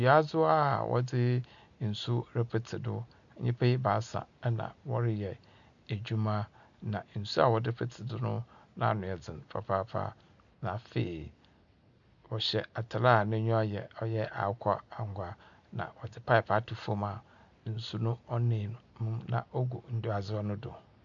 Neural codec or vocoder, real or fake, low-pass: none; real; 7.2 kHz